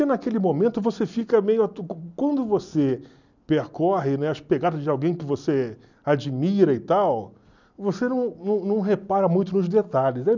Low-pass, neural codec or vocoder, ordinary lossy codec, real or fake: 7.2 kHz; none; none; real